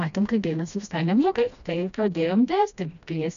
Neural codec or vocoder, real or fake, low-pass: codec, 16 kHz, 1 kbps, FreqCodec, smaller model; fake; 7.2 kHz